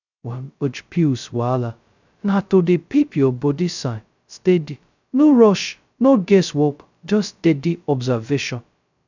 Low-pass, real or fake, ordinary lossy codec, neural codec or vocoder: 7.2 kHz; fake; none; codec, 16 kHz, 0.2 kbps, FocalCodec